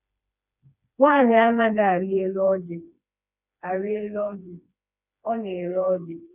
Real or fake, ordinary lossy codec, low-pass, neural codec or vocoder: fake; none; 3.6 kHz; codec, 16 kHz, 2 kbps, FreqCodec, smaller model